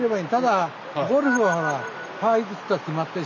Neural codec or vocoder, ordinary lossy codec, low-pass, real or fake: none; none; 7.2 kHz; real